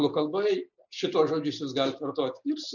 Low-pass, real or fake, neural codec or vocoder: 7.2 kHz; real; none